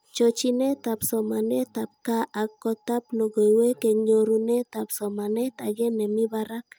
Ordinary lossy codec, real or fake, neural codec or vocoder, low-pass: none; real; none; none